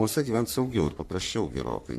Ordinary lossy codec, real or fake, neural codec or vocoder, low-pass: AAC, 96 kbps; fake; codec, 44.1 kHz, 3.4 kbps, Pupu-Codec; 14.4 kHz